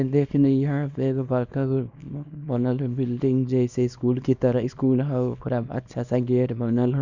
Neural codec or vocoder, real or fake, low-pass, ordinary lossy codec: codec, 24 kHz, 0.9 kbps, WavTokenizer, small release; fake; 7.2 kHz; Opus, 64 kbps